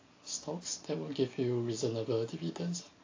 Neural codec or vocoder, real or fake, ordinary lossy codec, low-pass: none; real; AAC, 32 kbps; 7.2 kHz